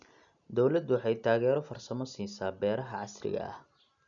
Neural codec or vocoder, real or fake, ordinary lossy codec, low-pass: none; real; none; 7.2 kHz